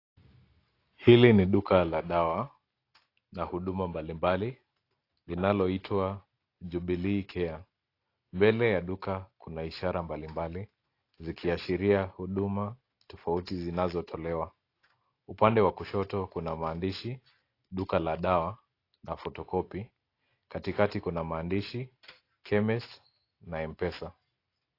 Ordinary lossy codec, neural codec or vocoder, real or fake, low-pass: AAC, 32 kbps; none; real; 5.4 kHz